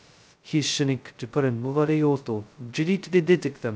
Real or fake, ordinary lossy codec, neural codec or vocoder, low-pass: fake; none; codec, 16 kHz, 0.2 kbps, FocalCodec; none